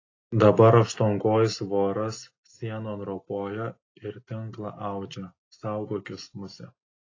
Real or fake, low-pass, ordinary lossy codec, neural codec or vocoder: real; 7.2 kHz; AAC, 32 kbps; none